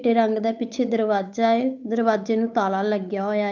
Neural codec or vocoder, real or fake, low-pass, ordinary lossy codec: codec, 16 kHz, 8 kbps, FunCodec, trained on Chinese and English, 25 frames a second; fake; 7.2 kHz; none